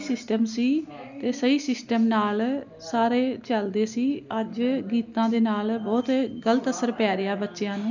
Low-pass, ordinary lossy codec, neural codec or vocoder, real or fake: 7.2 kHz; none; none; real